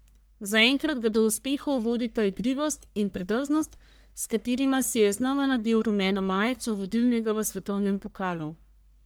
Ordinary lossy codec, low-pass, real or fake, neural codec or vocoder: none; none; fake; codec, 44.1 kHz, 1.7 kbps, Pupu-Codec